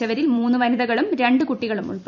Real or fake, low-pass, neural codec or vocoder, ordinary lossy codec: fake; 7.2 kHz; vocoder, 44.1 kHz, 128 mel bands every 256 samples, BigVGAN v2; none